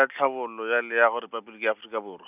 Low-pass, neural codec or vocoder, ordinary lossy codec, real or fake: 3.6 kHz; none; none; real